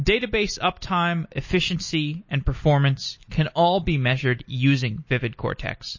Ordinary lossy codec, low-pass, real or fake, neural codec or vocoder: MP3, 32 kbps; 7.2 kHz; real; none